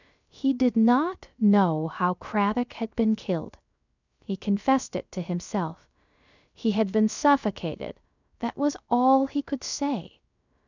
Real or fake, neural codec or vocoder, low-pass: fake; codec, 16 kHz, 0.3 kbps, FocalCodec; 7.2 kHz